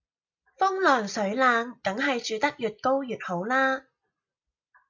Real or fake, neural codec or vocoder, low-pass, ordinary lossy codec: real; none; 7.2 kHz; MP3, 64 kbps